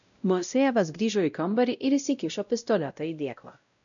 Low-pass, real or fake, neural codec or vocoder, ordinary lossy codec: 7.2 kHz; fake; codec, 16 kHz, 0.5 kbps, X-Codec, WavLM features, trained on Multilingual LibriSpeech; MP3, 96 kbps